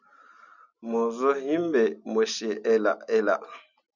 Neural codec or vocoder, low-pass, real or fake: none; 7.2 kHz; real